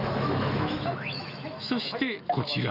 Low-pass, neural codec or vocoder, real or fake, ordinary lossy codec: 5.4 kHz; autoencoder, 48 kHz, 128 numbers a frame, DAC-VAE, trained on Japanese speech; fake; none